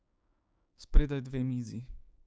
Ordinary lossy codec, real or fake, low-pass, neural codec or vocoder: none; fake; none; codec, 16 kHz, 6 kbps, DAC